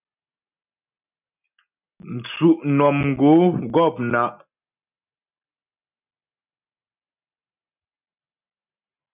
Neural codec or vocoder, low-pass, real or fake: none; 3.6 kHz; real